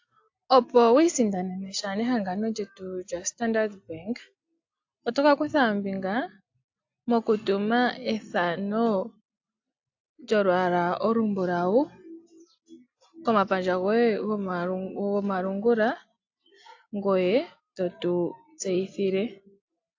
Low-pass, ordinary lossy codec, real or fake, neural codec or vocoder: 7.2 kHz; AAC, 48 kbps; real; none